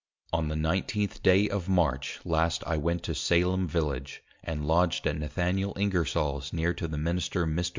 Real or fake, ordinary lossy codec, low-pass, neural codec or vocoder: real; MP3, 64 kbps; 7.2 kHz; none